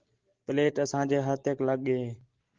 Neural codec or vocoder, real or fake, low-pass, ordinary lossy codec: none; real; 7.2 kHz; Opus, 16 kbps